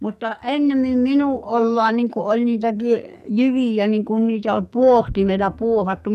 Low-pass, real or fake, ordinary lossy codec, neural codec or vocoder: 14.4 kHz; fake; none; codec, 44.1 kHz, 2.6 kbps, SNAC